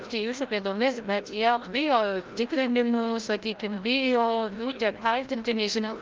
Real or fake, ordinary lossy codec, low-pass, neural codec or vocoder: fake; Opus, 24 kbps; 7.2 kHz; codec, 16 kHz, 0.5 kbps, FreqCodec, larger model